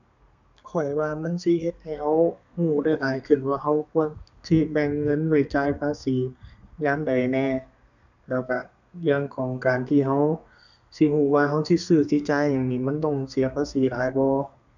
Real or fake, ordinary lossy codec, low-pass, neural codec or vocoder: fake; none; 7.2 kHz; codec, 32 kHz, 1.9 kbps, SNAC